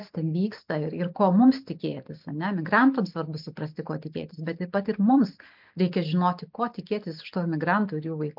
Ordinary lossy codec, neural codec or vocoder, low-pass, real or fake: MP3, 48 kbps; none; 5.4 kHz; real